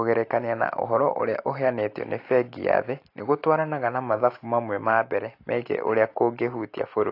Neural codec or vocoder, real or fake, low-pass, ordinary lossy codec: none; real; 5.4 kHz; AAC, 32 kbps